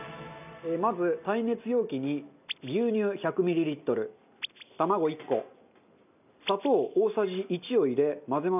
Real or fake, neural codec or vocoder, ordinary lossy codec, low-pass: real; none; none; 3.6 kHz